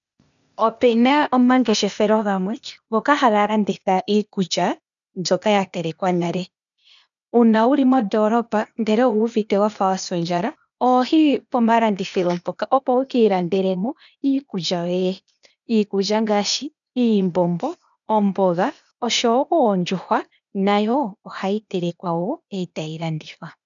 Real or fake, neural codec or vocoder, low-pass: fake; codec, 16 kHz, 0.8 kbps, ZipCodec; 7.2 kHz